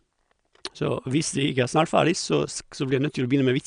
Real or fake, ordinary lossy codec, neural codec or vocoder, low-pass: real; none; none; 9.9 kHz